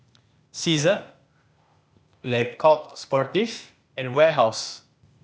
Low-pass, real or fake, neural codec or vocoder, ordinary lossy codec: none; fake; codec, 16 kHz, 0.8 kbps, ZipCodec; none